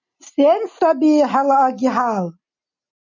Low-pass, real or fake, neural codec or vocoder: 7.2 kHz; real; none